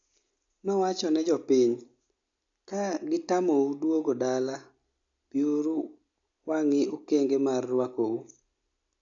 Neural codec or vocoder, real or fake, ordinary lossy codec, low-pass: none; real; AAC, 64 kbps; 7.2 kHz